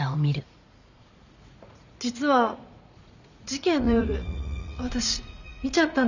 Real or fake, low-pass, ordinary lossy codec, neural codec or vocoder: fake; 7.2 kHz; none; vocoder, 44.1 kHz, 80 mel bands, Vocos